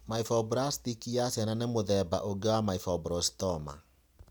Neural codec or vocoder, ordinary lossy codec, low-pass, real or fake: none; none; none; real